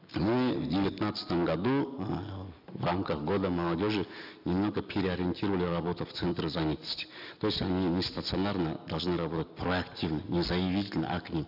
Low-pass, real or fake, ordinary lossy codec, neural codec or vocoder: 5.4 kHz; real; none; none